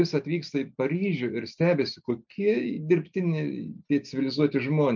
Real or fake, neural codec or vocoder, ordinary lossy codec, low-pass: real; none; MP3, 64 kbps; 7.2 kHz